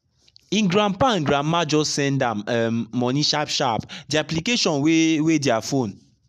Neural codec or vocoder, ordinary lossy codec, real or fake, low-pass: none; none; real; 14.4 kHz